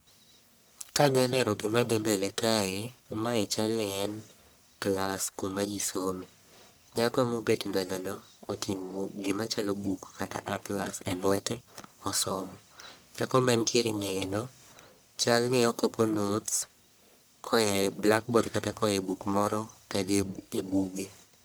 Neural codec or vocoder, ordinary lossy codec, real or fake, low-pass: codec, 44.1 kHz, 1.7 kbps, Pupu-Codec; none; fake; none